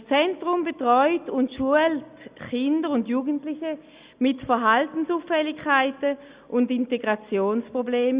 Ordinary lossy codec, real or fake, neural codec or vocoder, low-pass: Opus, 64 kbps; real; none; 3.6 kHz